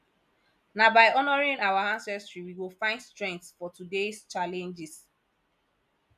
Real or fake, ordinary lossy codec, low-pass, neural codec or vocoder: real; none; 14.4 kHz; none